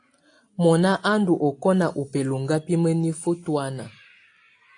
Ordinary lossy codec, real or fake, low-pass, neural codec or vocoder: AAC, 48 kbps; real; 9.9 kHz; none